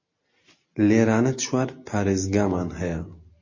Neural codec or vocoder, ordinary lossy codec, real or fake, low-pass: none; MP3, 32 kbps; real; 7.2 kHz